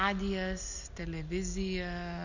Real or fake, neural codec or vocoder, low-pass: real; none; 7.2 kHz